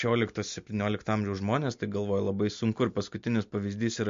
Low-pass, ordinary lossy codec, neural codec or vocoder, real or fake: 7.2 kHz; MP3, 48 kbps; none; real